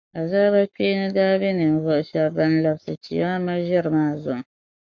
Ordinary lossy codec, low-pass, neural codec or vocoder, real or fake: Opus, 64 kbps; 7.2 kHz; codec, 44.1 kHz, 7.8 kbps, Pupu-Codec; fake